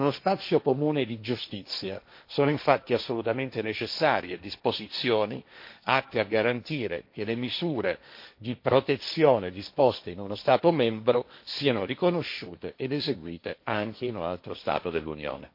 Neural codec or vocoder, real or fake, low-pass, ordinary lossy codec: codec, 16 kHz, 1.1 kbps, Voila-Tokenizer; fake; 5.4 kHz; MP3, 32 kbps